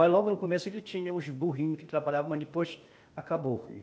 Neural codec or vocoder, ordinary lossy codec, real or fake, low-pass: codec, 16 kHz, 0.8 kbps, ZipCodec; none; fake; none